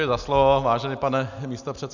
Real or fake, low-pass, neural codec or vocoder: real; 7.2 kHz; none